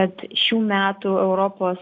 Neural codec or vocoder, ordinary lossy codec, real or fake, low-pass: none; AAC, 48 kbps; real; 7.2 kHz